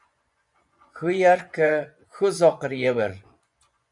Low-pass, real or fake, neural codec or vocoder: 10.8 kHz; fake; vocoder, 24 kHz, 100 mel bands, Vocos